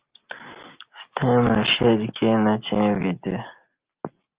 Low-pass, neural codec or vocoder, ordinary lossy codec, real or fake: 3.6 kHz; none; Opus, 32 kbps; real